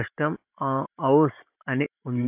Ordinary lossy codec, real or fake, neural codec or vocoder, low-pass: AAC, 32 kbps; fake; vocoder, 44.1 kHz, 128 mel bands every 512 samples, BigVGAN v2; 3.6 kHz